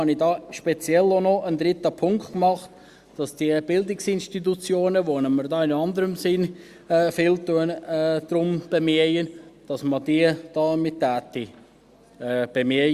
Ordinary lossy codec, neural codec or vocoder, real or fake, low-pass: Opus, 64 kbps; none; real; 14.4 kHz